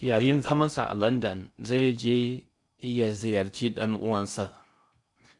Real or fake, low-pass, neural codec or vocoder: fake; 10.8 kHz; codec, 16 kHz in and 24 kHz out, 0.6 kbps, FocalCodec, streaming, 4096 codes